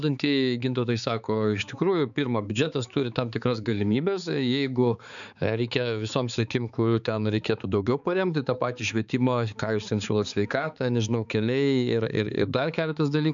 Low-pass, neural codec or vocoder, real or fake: 7.2 kHz; codec, 16 kHz, 4 kbps, X-Codec, HuBERT features, trained on balanced general audio; fake